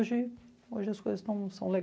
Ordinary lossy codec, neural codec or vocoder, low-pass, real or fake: none; none; none; real